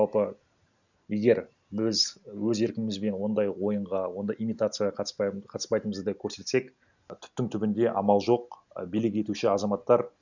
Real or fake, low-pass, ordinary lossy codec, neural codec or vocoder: real; 7.2 kHz; none; none